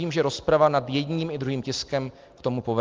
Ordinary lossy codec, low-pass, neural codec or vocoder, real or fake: Opus, 32 kbps; 7.2 kHz; none; real